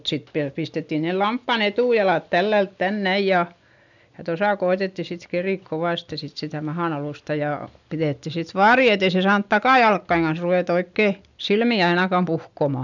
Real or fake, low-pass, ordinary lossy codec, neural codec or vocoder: real; 7.2 kHz; none; none